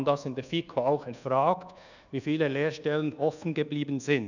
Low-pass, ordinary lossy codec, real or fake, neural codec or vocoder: 7.2 kHz; none; fake; codec, 24 kHz, 1.2 kbps, DualCodec